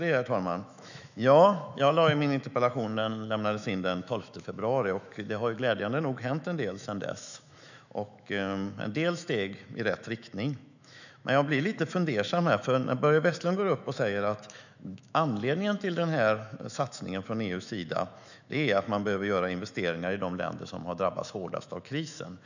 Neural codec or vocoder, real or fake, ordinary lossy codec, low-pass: autoencoder, 48 kHz, 128 numbers a frame, DAC-VAE, trained on Japanese speech; fake; none; 7.2 kHz